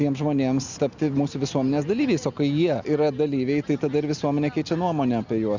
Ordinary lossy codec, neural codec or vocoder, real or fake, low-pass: Opus, 64 kbps; none; real; 7.2 kHz